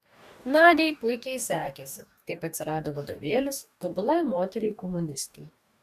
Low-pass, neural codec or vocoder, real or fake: 14.4 kHz; codec, 44.1 kHz, 2.6 kbps, DAC; fake